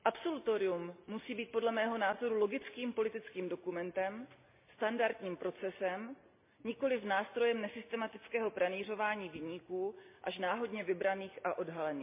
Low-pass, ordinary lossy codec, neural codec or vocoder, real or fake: 3.6 kHz; MP3, 32 kbps; none; real